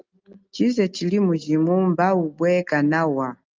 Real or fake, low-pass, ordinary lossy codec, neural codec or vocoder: real; 7.2 kHz; Opus, 24 kbps; none